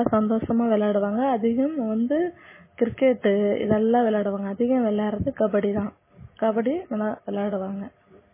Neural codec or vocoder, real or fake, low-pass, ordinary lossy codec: none; real; 3.6 kHz; MP3, 16 kbps